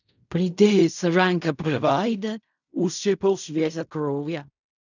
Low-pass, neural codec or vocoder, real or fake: 7.2 kHz; codec, 16 kHz in and 24 kHz out, 0.4 kbps, LongCat-Audio-Codec, fine tuned four codebook decoder; fake